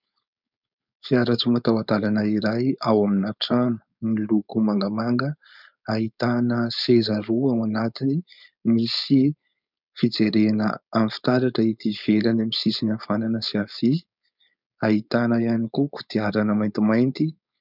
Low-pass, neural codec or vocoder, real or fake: 5.4 kHz; codec, 16 kHz, 4.8 kbps, FACodec; fake